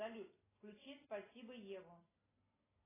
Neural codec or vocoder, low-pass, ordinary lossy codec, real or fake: none; 3.6 kHz; AAC, 16 kbps; real